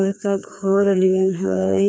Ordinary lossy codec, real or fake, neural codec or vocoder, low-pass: none; fake; codec, 16 kHz, 2 kbps, FreqCodec, larger model; none